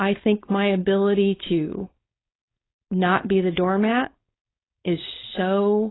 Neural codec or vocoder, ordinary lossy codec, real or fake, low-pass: codec, 16 kHz, 4.8 kbps, FACodec; AAC, 16 kbps; fake; 7.2 kHz